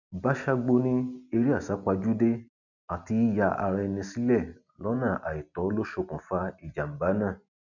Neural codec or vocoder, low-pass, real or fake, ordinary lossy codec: none; 7.2 kHz; real; none